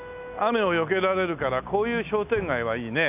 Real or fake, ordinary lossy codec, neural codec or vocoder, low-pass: real; none; none; 3.6 kHz